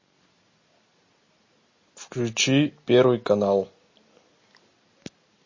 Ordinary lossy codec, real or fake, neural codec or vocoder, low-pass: MP3, 32 kbps; real; none; 7.2 kHz